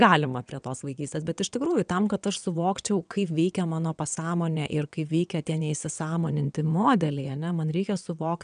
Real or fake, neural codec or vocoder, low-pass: fake; vocoder, 22.05 kHz, 80 mel bands, WaveNeXt; 9.9 kHz